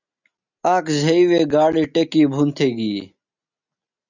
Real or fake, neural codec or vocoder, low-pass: real; none; 7.2 kHz